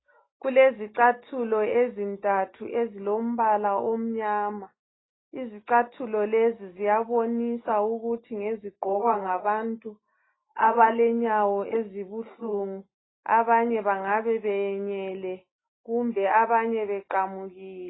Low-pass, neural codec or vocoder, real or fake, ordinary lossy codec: 7.2 kHz; none; real; AAC, 16 kbps